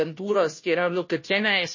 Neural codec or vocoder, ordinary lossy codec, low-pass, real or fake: codec, 16 kHz, 0.5 kbps, FunCodec, trained on Chinese and English, 25 frames a second; MP3, 32 kbps; 7.2 kHz; fake